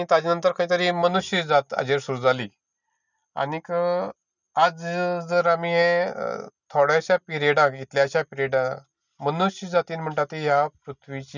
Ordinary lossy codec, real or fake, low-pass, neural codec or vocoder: none; real; 7.2 kHz; none